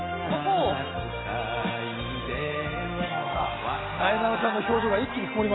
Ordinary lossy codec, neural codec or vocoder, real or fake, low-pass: AAC, 16 kbps; none; real; 7.2 kHz